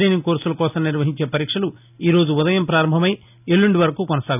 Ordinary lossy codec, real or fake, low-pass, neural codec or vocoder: none; real; 3.6 kHz; none